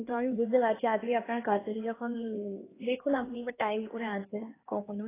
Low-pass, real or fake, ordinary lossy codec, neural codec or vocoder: 3.6 kHz; fake; AAC, 16 kbps; codec, 16 kHz, 2 kbps, X-Codec, HuBERT features, trained on LibriSpeech